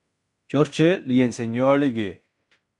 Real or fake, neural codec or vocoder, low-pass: fake; codec, 16 kHz in and 24 kHz out, 0.9 kbps, LongCat-Audio-Codec, fine tuned four codebook decoder; 10.8 kHz